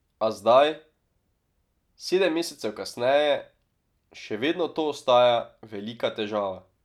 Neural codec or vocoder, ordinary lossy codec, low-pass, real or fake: none; none; 19.8 kHz; real